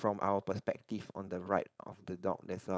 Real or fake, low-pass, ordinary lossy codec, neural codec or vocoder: fake; none; none; codec, 16 kHz, 4.8 kbps, FACodec